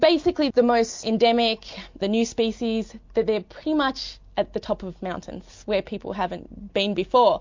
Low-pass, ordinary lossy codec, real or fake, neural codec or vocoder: 7.2 kHz; MP3, 48 kbps; real; none